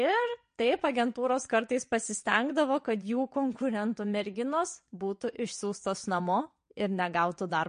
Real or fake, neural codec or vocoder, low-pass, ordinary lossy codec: fake; vocoder, 22.05 kHz, 80 mel bands, WaveNeXt; 9.9 kHz; MP3, 48 kbps